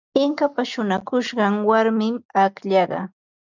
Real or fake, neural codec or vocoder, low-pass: real; none; 7.2 kHz